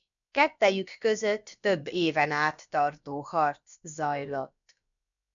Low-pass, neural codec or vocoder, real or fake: 7.2 kHz; codec, 16 kHz, about 1 kbps, DyCAST, with the encoder's durations; fake